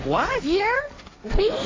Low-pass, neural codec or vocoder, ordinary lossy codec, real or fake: 7.2 kHz; codec, 16 kHz, 1.1 kbps, Voila-Tokenizer; AAC, 32 kbps; fake